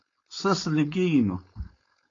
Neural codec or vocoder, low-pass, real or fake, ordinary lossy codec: codec, 16 kHz, 4.8 kbps, FACodec; 7.2 kHz; fake; AAC, 32 kbps